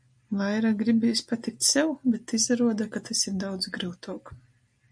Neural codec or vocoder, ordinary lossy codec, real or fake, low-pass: none; MP3, 64 kbps; real; 9.9 kHz